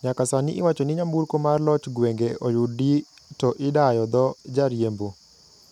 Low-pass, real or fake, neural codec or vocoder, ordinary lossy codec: 19.8 kHz; real; none; none